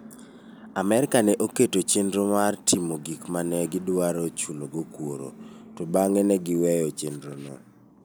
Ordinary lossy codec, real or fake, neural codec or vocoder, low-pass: none; real; none; none